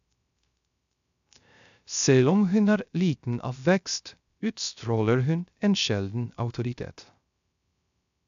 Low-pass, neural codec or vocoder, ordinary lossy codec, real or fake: 7.2 kHz; codec, 16 kHz, 0.3 kbps, FocalCodec; AAC, 96 kbps; fake